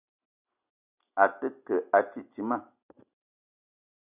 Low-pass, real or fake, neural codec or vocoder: 3.6 kHz; real; none